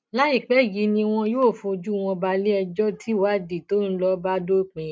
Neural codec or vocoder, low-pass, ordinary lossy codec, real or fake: none; none; none; real